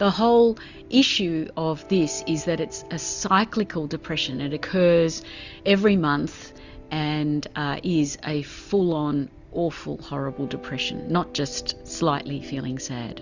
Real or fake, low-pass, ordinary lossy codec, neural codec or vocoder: real; 7.2 kHz; Opus, 64 kbps; none